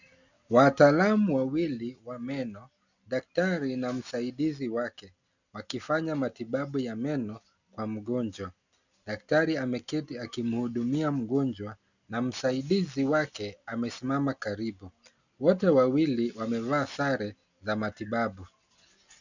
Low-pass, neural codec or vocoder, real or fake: 7.2 kHz; none; real